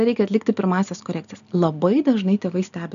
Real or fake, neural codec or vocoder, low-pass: real; none; 7.2 kHz